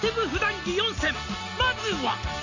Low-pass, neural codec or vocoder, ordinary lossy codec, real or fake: 7.2 kHz; none; AAC, 48 kbps; real